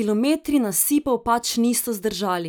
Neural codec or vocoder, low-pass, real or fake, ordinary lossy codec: none; none; real; none